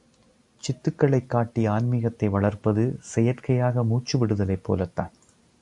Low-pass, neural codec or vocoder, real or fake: 10.8 kHz; none; real